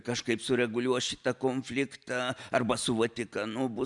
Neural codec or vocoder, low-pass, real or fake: none; 10.8 kHz; real